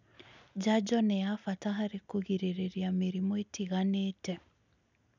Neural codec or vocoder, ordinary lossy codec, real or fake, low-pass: none; none; real; 7.2 kHz